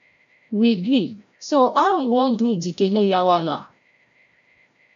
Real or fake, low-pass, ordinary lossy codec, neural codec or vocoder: fake; 7.2 kHz; MP3, 64 kbps; codec, 16 kHz, 0.5 kbps, FreqCodec, larger model